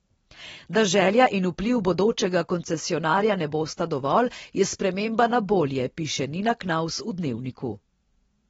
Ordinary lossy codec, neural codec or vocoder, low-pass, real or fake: AAC, 24 kbps; none; 9.9 kHz; real